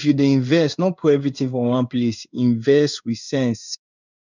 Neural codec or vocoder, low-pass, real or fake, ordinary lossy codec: codec, 16 kHz in and 24 kHz out, 1 kbps, XY-Tokenizer; 7.2 kHz; fake; none